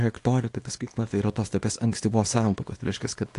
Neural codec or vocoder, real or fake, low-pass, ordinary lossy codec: codec, 24 kHz, 0.9 kbps, WavTokenizer, small release; fake; 10.8 kHz; AAC, 48 kbps